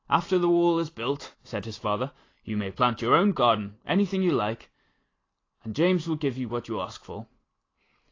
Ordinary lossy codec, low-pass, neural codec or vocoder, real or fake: AAC, 32 kbps; 7.2 kHz; none; real